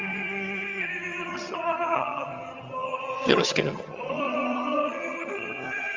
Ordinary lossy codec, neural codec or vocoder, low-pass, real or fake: Opus, 32 kbps; vocoder, 22.05 kHz, 80 mel bands, HiFi-GAN; 7.2 kHz; fake